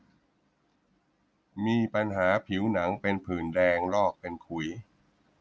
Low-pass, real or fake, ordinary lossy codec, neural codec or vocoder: none; real; none; none